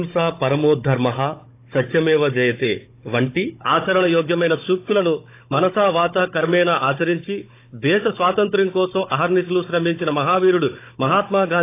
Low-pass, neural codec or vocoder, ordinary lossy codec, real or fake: 3.6 kHz; codec, 16 kHz, 16 kbps, FunCodec, trained on Chinese and English, 50 frames a second; AAC, 24 kbps; fake